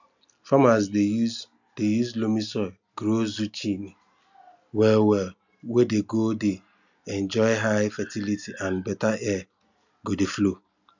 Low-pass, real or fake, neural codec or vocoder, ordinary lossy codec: 7.2 kHz; real; none; none